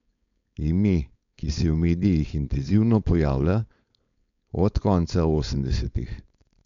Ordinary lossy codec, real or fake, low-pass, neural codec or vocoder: MP3, 96 kbps; fake; 7.2 kHz; codec, 16 kHz, 4.8 kbps, FACodec